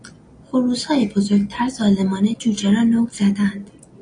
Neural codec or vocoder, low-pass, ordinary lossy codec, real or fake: none; 9.9 kHz; AAC, 48 kbps; real